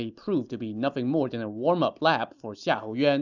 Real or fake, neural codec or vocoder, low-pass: real; none; 7.2 kHz